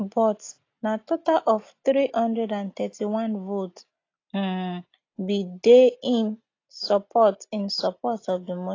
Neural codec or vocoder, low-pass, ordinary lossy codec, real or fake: none; 7.2 kHz; AAC, 32 kbps; real